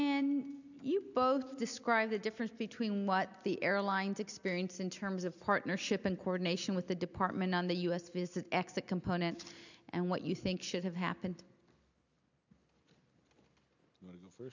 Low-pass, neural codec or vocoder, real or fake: 7.2 kHz; none; real